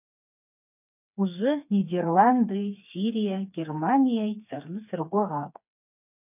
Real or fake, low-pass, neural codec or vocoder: fake; 3.6 kHz; codec, 16 kHz, 4 kbps, FreqCodec, smaller model